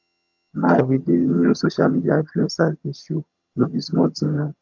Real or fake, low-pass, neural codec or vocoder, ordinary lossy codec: fake; 7.2 kHz; vocoder, 22.05 kHz, 80 mel bands, HiFi-GAN; MP3, 48 kbps